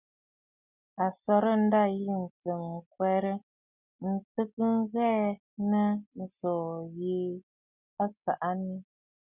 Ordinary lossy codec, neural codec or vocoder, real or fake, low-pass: Opus, 64 kbps; none; real; 3.6 kHz